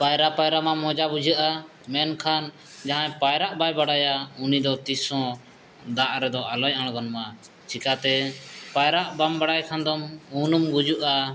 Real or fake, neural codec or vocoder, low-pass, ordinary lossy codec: real; none; none; none